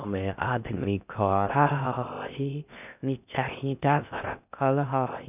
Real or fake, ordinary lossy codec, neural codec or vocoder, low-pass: fake; none; codec, 16 kHz in and 24 kHz out, 0.6 kbps, FocalCodec, streaming, 4096 codes; 3.6 kHz